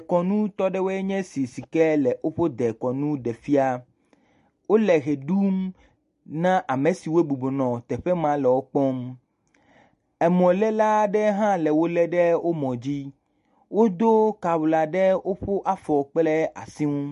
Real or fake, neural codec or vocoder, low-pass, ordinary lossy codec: real; none; 14.4 kHz; MP3, 48 kbps